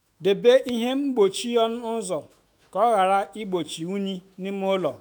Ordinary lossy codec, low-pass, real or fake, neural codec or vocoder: none; none; fake; autoencoder, 48 kHz, 128 numbers a frame, DAC-VAE, trained on Japanese speech